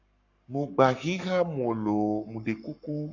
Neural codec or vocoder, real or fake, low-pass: codec, 44.1 kHz, 7.8 kbps, Pupu-Codec; fake; 7.2 kHz